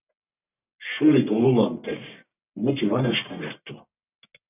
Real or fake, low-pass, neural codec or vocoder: fake; 3.6 kHz; codec, 44.1 kHz, 1.7 kbps, Pupu-Codec